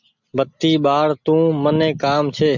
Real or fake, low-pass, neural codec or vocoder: real; 7.2 kHz; none